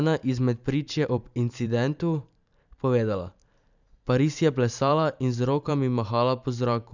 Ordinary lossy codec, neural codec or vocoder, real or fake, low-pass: none; vocoder, 44.1 kHz, 128 mel bands every 512 samples, BigVGAN v2; fake; 7.2 kHz